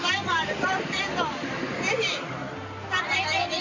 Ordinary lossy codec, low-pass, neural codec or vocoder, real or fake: MP3, 64 kbps; 7.2 kHz; none; real